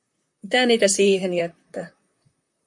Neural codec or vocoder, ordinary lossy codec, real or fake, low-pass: vocoder, 44.1 kHz, 128 mel bands, Pupu-Vocoder; MP3, 64 kbps; fake; 10.8 kHz